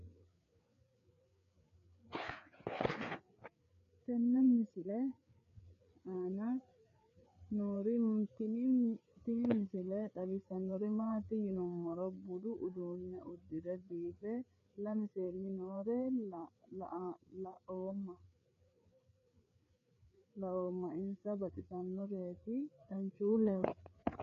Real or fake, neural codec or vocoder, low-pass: fake; codec, 16 kHz, 4 kbps, FreqCodec, larger model; 7.2 kHz